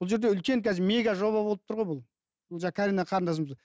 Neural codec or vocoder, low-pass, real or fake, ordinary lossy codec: none; none; real; none